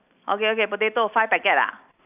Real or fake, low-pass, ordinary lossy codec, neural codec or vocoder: real; 3.6 kHz; none; none